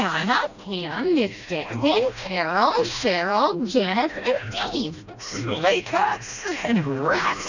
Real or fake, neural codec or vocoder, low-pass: fake; codec, 16 kHz, 1 kbps, FreqCodec, smaller model; 7.2 kHz